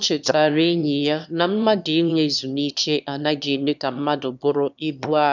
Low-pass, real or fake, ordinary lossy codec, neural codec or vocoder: 7.2 kHz; fake; none; autoencoder, 22.05 kHz, a latent of 192 numbers a frame, VITS, trained on one speaker